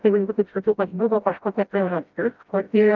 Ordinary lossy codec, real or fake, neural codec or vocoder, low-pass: Opus, 32 kbps; fake; codec, 16 kHz, 0.5 kbps, FreqCodec, smaller model; 7.2 kHz